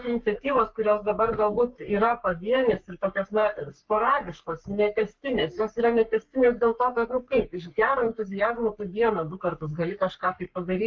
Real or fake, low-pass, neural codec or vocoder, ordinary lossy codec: fake; 7.2 kHz; codec, 44.1 kHz, 3.4 kbps, Pupu-Codec; Opus, 32 kbps